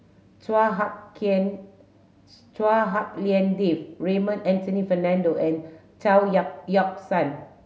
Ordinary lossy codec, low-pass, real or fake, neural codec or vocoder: none; none; real; none